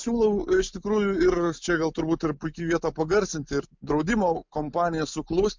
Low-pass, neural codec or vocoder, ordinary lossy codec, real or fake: 7.2 kHz; none; MP3, 64 kbps; real